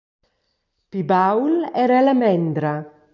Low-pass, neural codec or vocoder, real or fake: 7.2 kHz; none; real